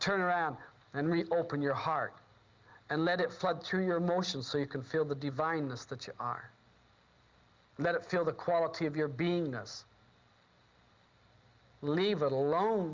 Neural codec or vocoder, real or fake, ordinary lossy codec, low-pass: none; real; Opus, 32 kbps; 7.2 kHz